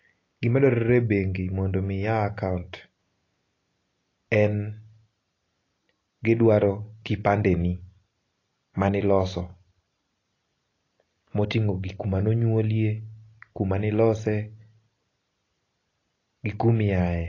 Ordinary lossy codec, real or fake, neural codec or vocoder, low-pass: AAC, 32 kbps; real; none; 7.2 kHz